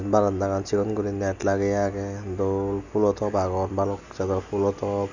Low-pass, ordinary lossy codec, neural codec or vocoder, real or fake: 7.2 kHz; none; none; real